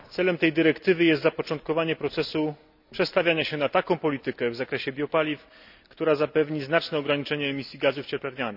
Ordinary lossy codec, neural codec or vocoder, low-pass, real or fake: none; none; 5.4 kHz; real